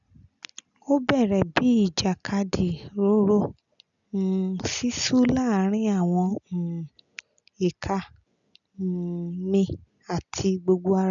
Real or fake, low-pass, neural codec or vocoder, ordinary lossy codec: real; 7.2 kHz; none; none